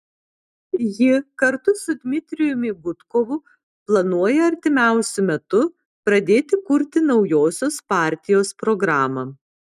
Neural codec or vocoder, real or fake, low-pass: none; real; 14.4 kHz